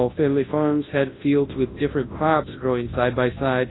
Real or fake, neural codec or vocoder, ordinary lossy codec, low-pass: fake; codec, 24 kHz, 0.9 kbps, WavTokenizer, large speech release; AAC, 16 kbps; 7.2 kHz